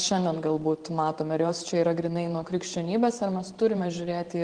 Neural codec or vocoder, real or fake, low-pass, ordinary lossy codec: vocoder, 44.1 kHz, 128 mel bands every 512 samples, BigVGAN v2; fake; 9.9 kHz; Opus, 16 kbps